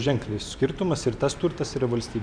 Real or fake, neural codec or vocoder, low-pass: fake; vocoder, 44.1 kHz, 128 mel bands every 512 samples, BigVGAN v2; 9.9 kHz